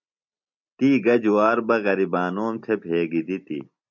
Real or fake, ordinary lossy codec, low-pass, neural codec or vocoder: real; MP3, 64 kbps; 7.2 kHz; none